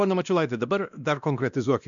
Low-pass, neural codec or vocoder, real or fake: 7.2 kHz; codec, 16 kHz, 1 kbps, X-Codec, WavLM features, trained on Multilingual LibriSpeech; fake